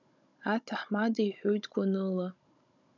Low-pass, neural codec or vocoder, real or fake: 7.2 kHz; codec, 16 kHz, 16 kbps, FunCodec, trained on Chinese and English, 50 frames a second; fake